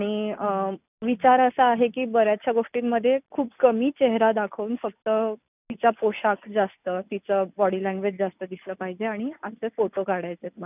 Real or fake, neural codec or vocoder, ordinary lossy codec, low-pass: real; none; none; 3.6 kHz